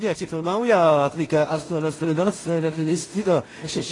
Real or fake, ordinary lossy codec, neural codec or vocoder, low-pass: fake; AAC, 32 kbps; codec, 16 kHz in and 24 kHz out, 0.4 kbps, LongCat-Audio-Codec, two codebook decoder; 10.8 kHz